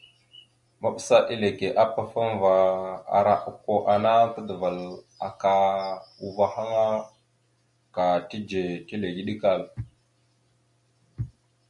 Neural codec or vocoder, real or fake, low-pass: none; real; 10.8 kHz